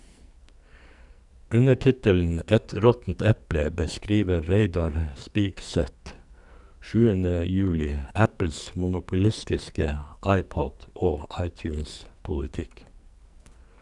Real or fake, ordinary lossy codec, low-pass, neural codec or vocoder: fake; none; 10.8 kHz; codec, 44.1 kHz, 2.6 kbps, SNAC